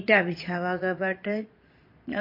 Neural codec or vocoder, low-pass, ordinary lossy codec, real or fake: vocoder, 22.05 kHz, 80 mel bands, Vocos; 5.4 kHz; MP3, 32 kbps; fake